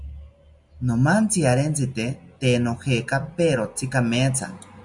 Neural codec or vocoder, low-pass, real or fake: none; 10.8 kHz; real